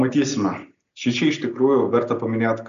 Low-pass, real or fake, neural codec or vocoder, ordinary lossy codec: 7.2 kHz; real; none; AAC, 96 kbps